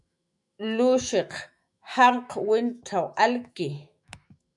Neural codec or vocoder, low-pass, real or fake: autoencoder, 48 kHz, 128 numbers a frame, DAC-VAE, trained on Japanese speech; 10.8 kHz; fake